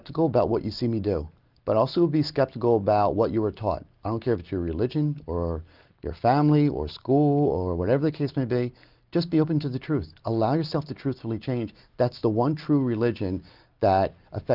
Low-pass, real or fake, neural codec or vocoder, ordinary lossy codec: 5.4 kHz; real; none; Opus, 32 kbps